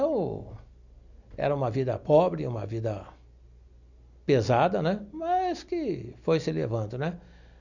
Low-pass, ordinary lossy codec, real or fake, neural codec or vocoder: 7.2 kHz; none; real; none